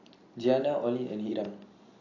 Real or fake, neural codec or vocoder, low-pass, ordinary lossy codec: real; none; 7.2 kHz; AAC, 32 kbps